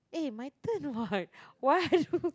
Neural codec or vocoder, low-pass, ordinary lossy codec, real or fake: none; none; none; real